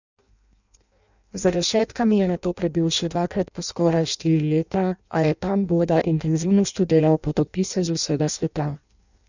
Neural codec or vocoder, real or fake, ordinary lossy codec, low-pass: codec, 16 kHz in and 24 kHz out, 0.6 kbps, FireRedTTS-2 codec; fake; none; 7.2 kHz